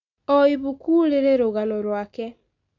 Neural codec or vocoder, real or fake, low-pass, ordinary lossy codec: none; real; 7.2 kHz; none